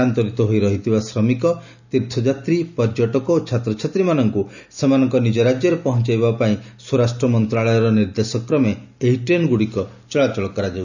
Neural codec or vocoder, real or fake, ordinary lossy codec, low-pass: none; real; none; 7.2 kHz